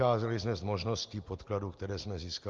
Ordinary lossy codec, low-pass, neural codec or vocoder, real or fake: Opus, 32 kbps; 7.2 kHz; none; real